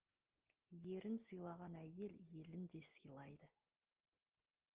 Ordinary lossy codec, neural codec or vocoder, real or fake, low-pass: Opus, 16 kbps; none; real; 3.6 kHz